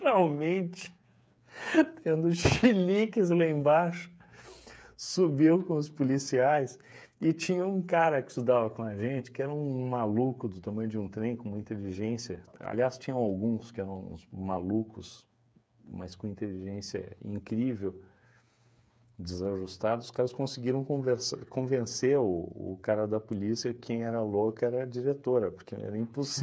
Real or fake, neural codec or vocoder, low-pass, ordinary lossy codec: fake; codec, 16 kHz, 8 kbps, FreqCodec, smaller model; none; none